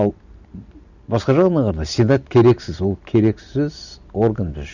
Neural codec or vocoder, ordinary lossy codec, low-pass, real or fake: none; none; 7.2 kHz; real